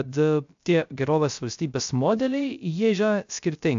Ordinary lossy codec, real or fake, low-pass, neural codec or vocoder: AAC, 64 kbps; fake; 7.2 kHz; codec, 16 kHz, 0.3 kbps, FocalCodec